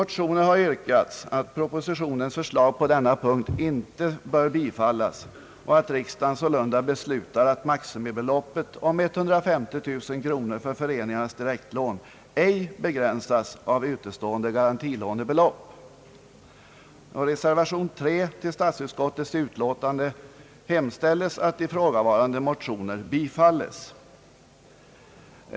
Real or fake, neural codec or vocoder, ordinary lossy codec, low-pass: real; none; none; none